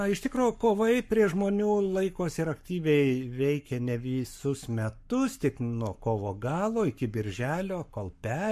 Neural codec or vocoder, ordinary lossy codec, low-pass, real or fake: codec, 44.1 kHz, 7.8 kbps, Pupu-Codec; MP3, 64 kbps; 14.4 kHz; fake